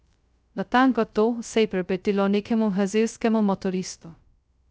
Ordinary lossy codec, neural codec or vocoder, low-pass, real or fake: none; codec, 16 kHz, 0.2 kbps, FocalCodec; none; fake